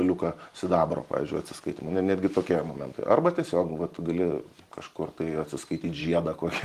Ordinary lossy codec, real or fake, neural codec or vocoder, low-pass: Opus, 24 kbps; fake; vocoder, 44.1 kHz, 128 mel bands every 512 samples, BigVGAN v2; 14.4 kHz